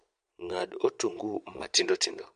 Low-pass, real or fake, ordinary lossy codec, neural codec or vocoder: 9.9 kHz; fake; MP3, 64 kbps; vocoder, 22.05 kHz, 80 mel bands, Vocos